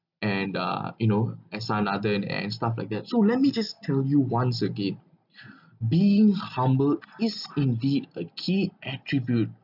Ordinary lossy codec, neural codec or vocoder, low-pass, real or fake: none; none; 5.4 kHz; real